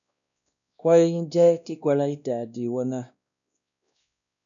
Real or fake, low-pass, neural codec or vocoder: fake; 7.2 kHz; codec, 16 kHz, 1 kbps, X-Codec, WavLM features, trained on Multilingual LibriSpeech